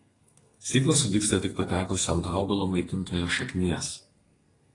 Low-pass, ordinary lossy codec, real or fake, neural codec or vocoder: 10.8 kHz; AAC, 32 kbps; fake; codec, 32 kHz, 1.9 kbps, SNAC